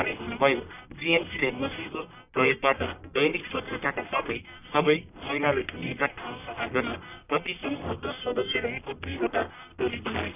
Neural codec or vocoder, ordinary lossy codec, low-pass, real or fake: codec, 44.1 kHz, 1.7 kbps, Pupu-Codec; none; 3.6 kHz; fake